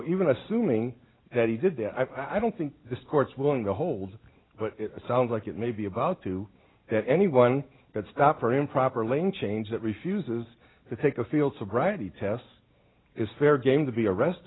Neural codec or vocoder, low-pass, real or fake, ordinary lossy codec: none; 7.2 kHz; real; AAC, 16 kbps